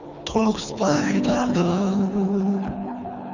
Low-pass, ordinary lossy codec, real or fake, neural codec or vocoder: 7.2 kHz; none; fake; codec, 24 kHz, 3 kbps, HILCodec